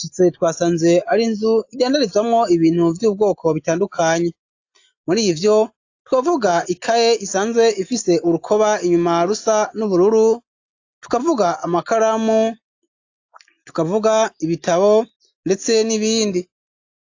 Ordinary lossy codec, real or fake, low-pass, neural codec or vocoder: AAC, 48 kbps; real; 7.2 kHz; none